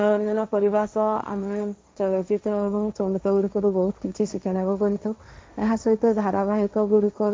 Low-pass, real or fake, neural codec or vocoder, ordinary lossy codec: none; fake; codec, 16 kHz, 1.1 kbps, Voila-Tokenizer; none